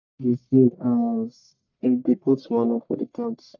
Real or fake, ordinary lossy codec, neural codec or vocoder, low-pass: fake; none; codec, 44.1 kHz, 1.7 kbps, Pupu-Codec; 7.2 kHz